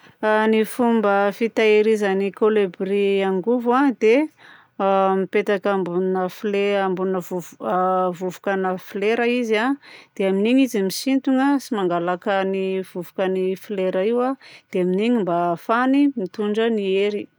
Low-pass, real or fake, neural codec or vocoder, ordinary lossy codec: none; real; none; none